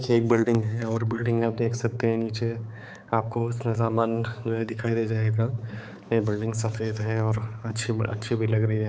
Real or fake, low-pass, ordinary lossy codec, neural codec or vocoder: fake; none; none; codec, 16 kHz, 4 kbps, X-Codec, HuBERT features, trained on balanced general audio